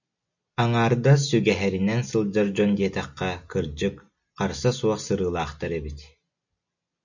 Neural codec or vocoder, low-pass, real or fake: none; 7.2 kHz; real